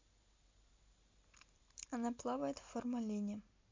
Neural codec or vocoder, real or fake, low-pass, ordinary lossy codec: none; real; 7.2 kHz; MP3, 48 kbps